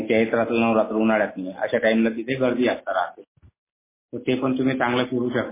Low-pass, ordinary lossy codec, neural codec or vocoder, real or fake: 3.6 kHz; MP3, 16 kbps; none; real